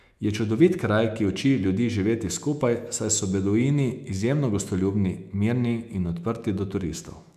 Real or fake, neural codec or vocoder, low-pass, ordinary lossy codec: fake; autoencoder, 48 kHz, 128 numbers a frame, DAC-VAE, trained on Japanese speech; 14.4 kHz; none